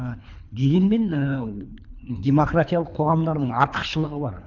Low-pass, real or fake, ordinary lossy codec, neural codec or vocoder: 7.2 kHz; fake; none; codec, 24 kHz, 3 kbps, HILCodec